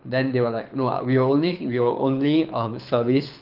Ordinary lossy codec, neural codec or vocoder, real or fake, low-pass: Opus, 32 kbps; codec, 24 kHz, 6 kbps, HILCodec; fake; 5.4 kHz